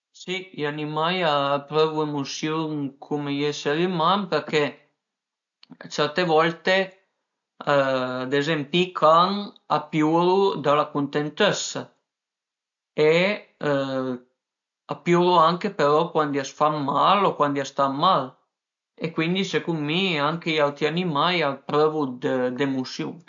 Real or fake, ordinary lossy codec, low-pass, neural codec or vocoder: real; AAC, 64 kbps; 7.2 kHz; none